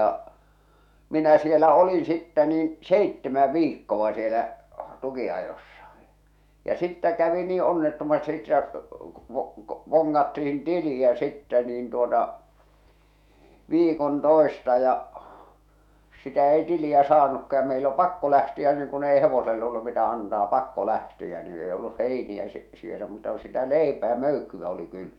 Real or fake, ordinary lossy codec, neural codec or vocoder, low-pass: fake; none; autoencoder, 48 kHz, 128 numbers a frame, DAC-VAE, trained on Japanese speech; 19.8 kHz